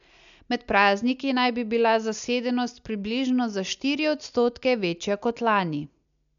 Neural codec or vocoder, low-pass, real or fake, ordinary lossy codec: none; 7.2 kHz; real; MP3, 96 kbps